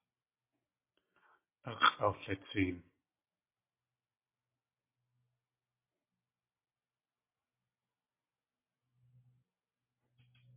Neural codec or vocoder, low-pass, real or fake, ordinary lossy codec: none; 3.6 kHz; real; MP3, 24 kbps